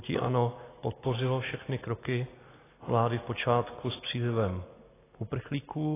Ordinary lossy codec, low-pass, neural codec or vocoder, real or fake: AAC, 16 kbps; 3.6 kHz; none; real